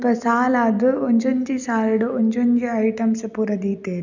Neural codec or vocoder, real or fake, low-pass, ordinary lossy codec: none; real; 7.2 kHz; none